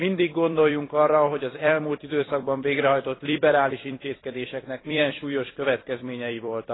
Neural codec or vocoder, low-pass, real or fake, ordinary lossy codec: vocoder, 44.1 kHz, 128 mel bands every 256 samples, BigVGAN v2; 7.2 kHz; fake; AAC, 16 kbps